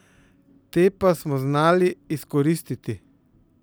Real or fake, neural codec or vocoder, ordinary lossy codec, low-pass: real; none; none; none